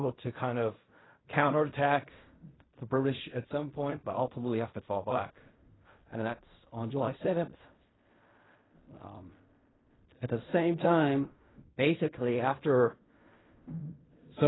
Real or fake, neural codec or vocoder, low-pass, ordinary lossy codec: fake; codec, 16 kHz in and 24 kHz out, 0.4 kbps, LongCat-Audio-Codec, fine tuned four codebook decoder; 7.2 kHz; AAC, 16 kbps